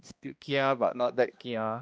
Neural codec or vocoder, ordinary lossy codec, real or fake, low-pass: codec, 16 kHz, 1 kbps, X-Codec, HuBERT features, trained on balanced general audio; none; fake; none